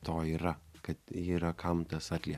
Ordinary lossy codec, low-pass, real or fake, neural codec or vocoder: AAC, 96 kbps; 14.4 kHz; real; none